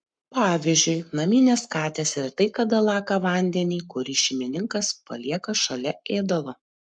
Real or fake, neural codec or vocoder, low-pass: fake; codec, 44.1 kHz, 7.8 kbps, Pupu-Codec; 9.9 kHz